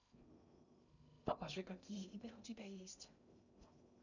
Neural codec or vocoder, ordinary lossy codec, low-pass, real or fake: codec, 16 kHz in and 24 kHz out, 0.6 kbps, FocalCodec, streaming, 2048 codes; none; 7.2 kHz; fake